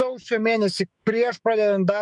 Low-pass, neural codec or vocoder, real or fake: 10.8 kHz; autoencoder, 48 kHz, 128 numbers a frame, DAC-VAE, trained on Japanese speech; fake